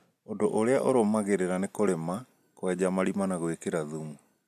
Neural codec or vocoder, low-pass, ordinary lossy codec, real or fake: none; 14.4 kHz; none; real